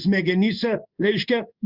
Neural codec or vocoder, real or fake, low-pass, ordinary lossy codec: codec, 16 kHz in and 24 kHz out, 1 kbps, XY-Tokenizer; fake; 5.4 kHz; Opus, 64 kbps